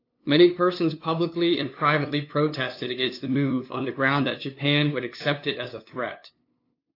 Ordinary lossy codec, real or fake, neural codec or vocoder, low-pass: AAC, 32 kbps; fake; codec, 16 kHz, 2 kbps, FunCodec, trained on LibriTTS, 25 frames a second; 5.4 kHz